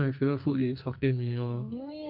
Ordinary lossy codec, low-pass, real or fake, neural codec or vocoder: none; 5.4 kHz; fake; codec, 44.1 kHz, 2.6 kbps, SNAC